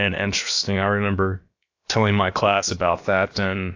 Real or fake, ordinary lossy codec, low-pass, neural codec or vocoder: fake; AAC, 48 kbps; 7.2 kHz; codec, 16 kHz, about 1 kbps, DyCAST, with the encoder's durations